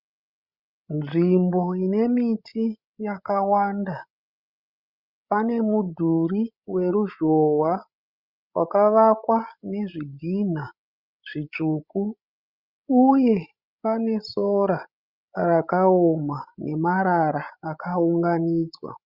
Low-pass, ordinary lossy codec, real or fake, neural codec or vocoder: 5.4 kHz; Opus, 64 kbps; fake; codec, 16 kHz, 16 kbps, FreqCodec, larger model